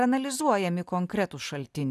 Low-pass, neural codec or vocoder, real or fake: 14.4 kHz; none; real